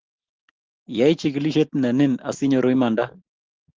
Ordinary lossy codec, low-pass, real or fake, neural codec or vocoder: Opus, 16 kbps; 7.2 kHz; real; none